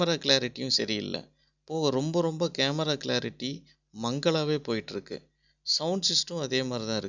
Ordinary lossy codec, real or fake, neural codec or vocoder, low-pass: none; real; none; 7.2 kHz